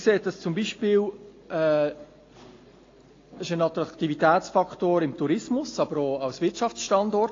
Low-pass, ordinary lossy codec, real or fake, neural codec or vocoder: 7.2 kHz; AAC, 32 kbps; real; none